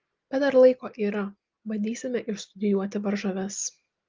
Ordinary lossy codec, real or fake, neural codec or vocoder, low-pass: Opus, 32 kbps; real; none; 7.2 kHz